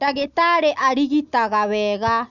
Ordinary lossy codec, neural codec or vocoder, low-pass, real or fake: none; none; 7.2 kHz; real